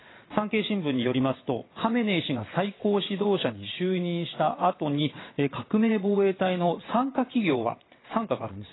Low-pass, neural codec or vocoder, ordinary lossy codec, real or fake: 7.2 kHz; vocoder, 22.05 kHz, 80 mel bands, WaveNeXt; AAC, 16 kbps; fake